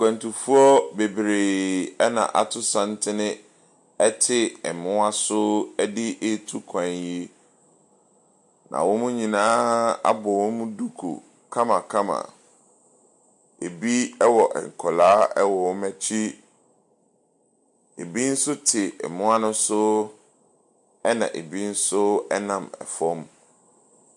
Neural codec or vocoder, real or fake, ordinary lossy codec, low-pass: none; real; MP3, 64 kbps; 10.8 kHz